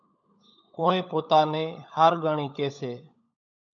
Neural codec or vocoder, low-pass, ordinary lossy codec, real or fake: codec, 16 kHz, 16 kbps, FunCodec, trained on LibriTTS, 50 frames a second; 7.2 kHz; AAC, 64 kbps; fake